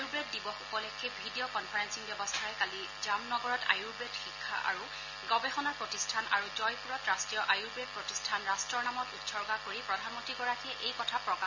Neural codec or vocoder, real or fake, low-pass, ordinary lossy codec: none; real; 7.2 kHz; none